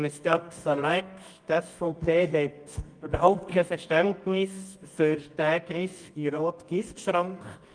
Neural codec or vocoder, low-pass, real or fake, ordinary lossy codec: codec, 24 kHz, 0.9 kbps, WavTokenizer, medium music audio release; 9.9 kHz; fake; Opus, 32 kbps